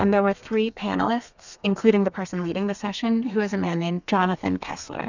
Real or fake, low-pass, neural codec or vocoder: fake; 7.2 kHz; codec, 32 kHz, 1.9 kbps, SNAC